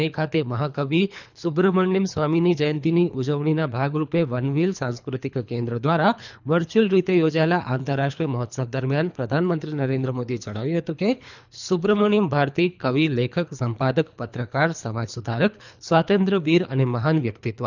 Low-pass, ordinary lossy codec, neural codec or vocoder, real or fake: 7.2 kHz; none; codec, 24 kHz, 3 kbps, HILCodec; fake